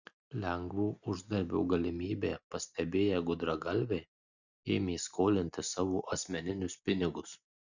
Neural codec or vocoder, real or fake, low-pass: none; real; 7.2 kHz